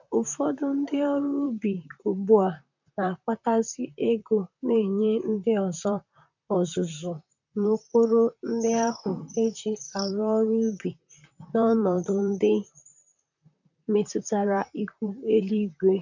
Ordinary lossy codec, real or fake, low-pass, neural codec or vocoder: none; fake; 7.2 kHz; vocoder, 44.1 kHz, 128 mel bands, Pupu-Vocoder